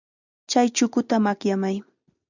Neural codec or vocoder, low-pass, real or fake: none; 7.2 kHz; real